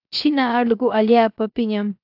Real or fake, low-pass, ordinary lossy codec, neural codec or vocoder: fake; 5.4 kHz; AAC, 48 kbps; codec, 16 kHz, 0.7 kbps, FocalCodec